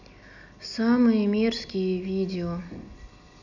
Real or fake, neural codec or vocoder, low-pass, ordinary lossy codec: real; none; 7.2 kHz; none